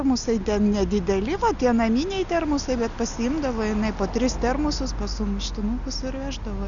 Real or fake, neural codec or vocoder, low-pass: real; none; 7.2 kHz